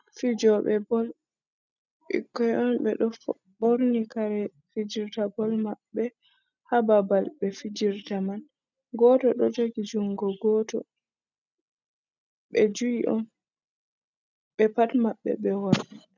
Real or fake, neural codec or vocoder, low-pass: real; none; 7.2 kHz